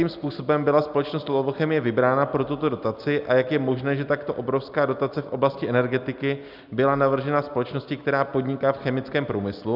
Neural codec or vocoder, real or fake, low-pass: none; real; 5.4 kHz